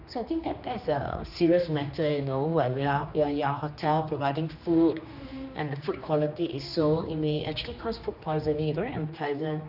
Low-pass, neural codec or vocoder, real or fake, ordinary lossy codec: 5.4 kHz; codec, 16 kHz, 2 kbps, X-Codec, HuBERT features, trained on general audio; fake; none